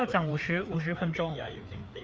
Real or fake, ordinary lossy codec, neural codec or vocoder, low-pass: fake; none; codec, 16 kHz, 4 kbps, FreqCodec, larger model; none